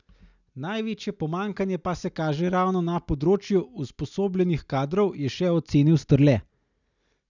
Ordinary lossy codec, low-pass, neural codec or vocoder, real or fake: none; 7.2 kHz; none; real